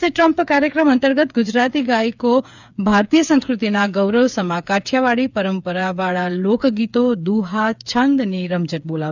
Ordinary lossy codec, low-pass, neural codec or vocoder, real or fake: none; 7.2 kHz; codec, 16 kHz, 8 kbps, FreqCodec, smaller model; fake